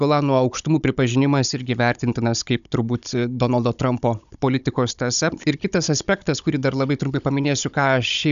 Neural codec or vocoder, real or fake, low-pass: codec, 16 kHz, 16 kbps, FunCodec, trained on Chinese and English, 50 frames a second; fake; 7.2 kHz